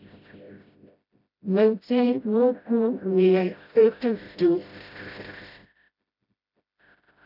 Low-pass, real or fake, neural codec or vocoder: 5.4 kHz; fake; codec, 16 kHz, 0.5 kbps, FreqCodec, smaller model